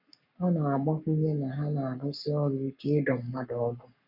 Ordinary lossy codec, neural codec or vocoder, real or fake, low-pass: none; codec, 44.1 kHz, 7.8 kbps, Pupu-Codec; fake; 5.4 kHz